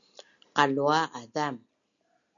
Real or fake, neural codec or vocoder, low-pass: real; none; 7.2 kHz